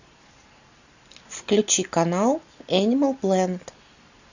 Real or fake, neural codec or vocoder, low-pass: real; none; 7.2 kHz